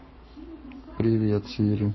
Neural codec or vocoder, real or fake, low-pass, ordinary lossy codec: codec, 44.1 kHz, 7.8 kbps, Pupu-Codec; fake; 7.2 kHz; MP3, 24 kbps